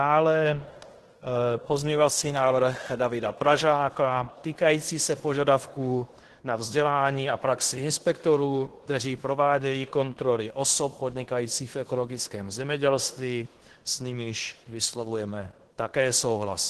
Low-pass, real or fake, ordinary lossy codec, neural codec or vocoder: 10.8 kHz; fake; Opus, 16 kbps; codec, 16 kHz in and 24 kHz out, 0.9 kbps, LongCat-Audio-Codec, fine tuned four codebook decoder